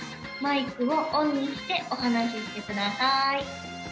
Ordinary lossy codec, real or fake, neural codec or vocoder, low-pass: none; real; none; none